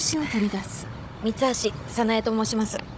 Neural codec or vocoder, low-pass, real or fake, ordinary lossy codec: codec, 16 kHz, 16 kbps, FunCodec, trained on Chinese and English, 50 frames a second; none; fake; none